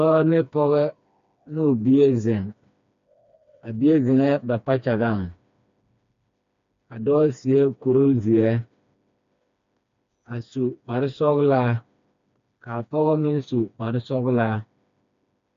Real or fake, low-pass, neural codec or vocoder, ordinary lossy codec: fake; 7.2 kHz; codec, 16 kHz, 2 kbps, FreqCodec, smaller model; MP3, 48 kbps